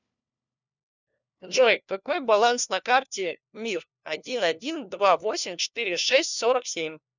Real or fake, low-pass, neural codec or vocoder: fake; 7.2 kHz; codec, 16 kHz, 1 kbps, FunCodec, trained on LibriTTS, 50 frames a second